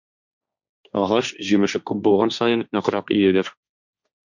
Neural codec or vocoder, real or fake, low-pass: codec, 16 kHz, 1.1 kbps, Voila-Tokenizer; fake; 7.2 kHz